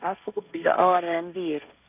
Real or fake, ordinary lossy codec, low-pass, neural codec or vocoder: fake; none; 3.6 kHz; codec, 16 kHz, 1.1 kbps, Voila-Tokenizer